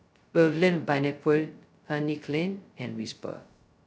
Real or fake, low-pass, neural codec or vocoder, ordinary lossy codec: fake; none; codec, 16 kHz, 0.2 kbps, FocalCodec; none